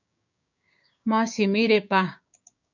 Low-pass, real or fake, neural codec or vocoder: 7.2 kHz; fake; codec, 16 kHz, 6 kbps, DAC